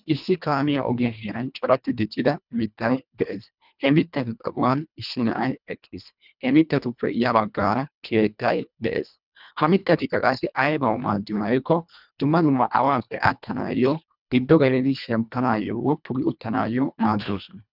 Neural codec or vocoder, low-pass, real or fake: codec, 24 kHz, 1.5 kbps, HILCodec; 5.4 kHz; fake